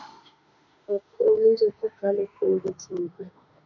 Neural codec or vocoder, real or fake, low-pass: autoencoder, 48 kHz, 32 numbers a frame, DAC-VAE, trained on Japanese speech; fake; 7.2 kHz